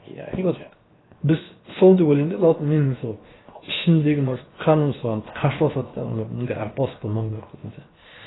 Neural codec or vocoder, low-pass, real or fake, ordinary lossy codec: codec, 16 kHz, 0.7 kbps, FocalCodec; 7.2 kHz; fake; AAC, 16 kbps